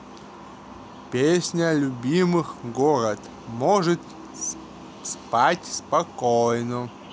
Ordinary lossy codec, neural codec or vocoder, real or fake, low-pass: none; none; real; none